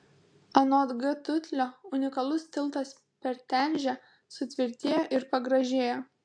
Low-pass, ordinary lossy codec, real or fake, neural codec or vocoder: 9.9 kHz; AAC, 64 kbps; real; none